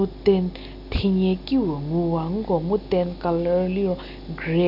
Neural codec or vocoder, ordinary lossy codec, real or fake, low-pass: none; none; real; 5.4 kHz